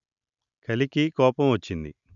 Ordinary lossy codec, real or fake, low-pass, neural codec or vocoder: none; real; 7.2 kHz; none